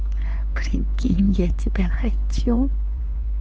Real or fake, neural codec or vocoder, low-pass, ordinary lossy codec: fake; codec, 16 kHz, 4 kbps, X-Codec, HuBERT features, trained on LibriSpeech; none; none